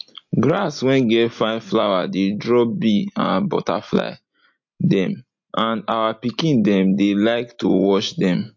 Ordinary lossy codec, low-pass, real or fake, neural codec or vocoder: MP3, 48 kbps; 7.2 kHz; real; none